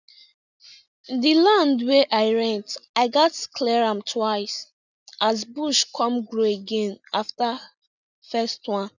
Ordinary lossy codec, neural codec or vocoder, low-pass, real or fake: none; none; 7.2 kHz; real